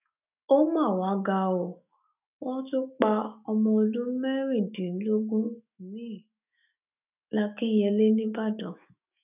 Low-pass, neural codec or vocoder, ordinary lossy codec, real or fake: 3.6 kHz; autoencoder, 48 kHz, 128 numbers a frame, DAC-VAE, trained on Japanese speech; none; fake